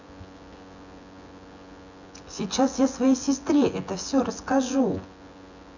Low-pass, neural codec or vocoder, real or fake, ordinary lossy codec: 7.2 kHz; vocoder, 24 kHz, 100 mel bands, Vocos; fake; none